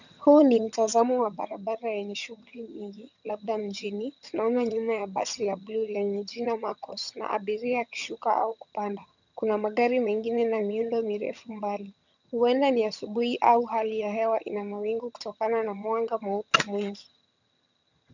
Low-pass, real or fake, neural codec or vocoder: 7.2 kHz; fake; vocoder, 22.05 kHz, 80 mel bands, HiFi-GAN